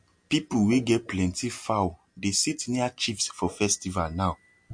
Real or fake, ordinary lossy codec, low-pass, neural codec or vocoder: real; MP3, 48 kbps; 9.9 kHz; none